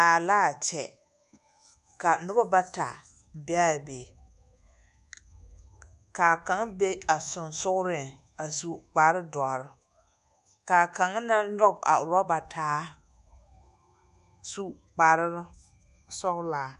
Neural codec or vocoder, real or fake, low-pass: codec, 24 kHz, 1.2 kbps, DualCodec; fake; 10.8 kHz